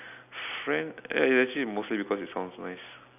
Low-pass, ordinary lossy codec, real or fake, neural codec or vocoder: 3.6 kHz; none; real; none